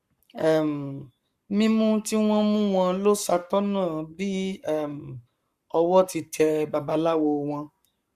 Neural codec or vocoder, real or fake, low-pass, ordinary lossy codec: codec, 44.1 kHz, 7.8 kbps, Pupu-Codec; fake; 14.4 kHz; Opus, 64 kbps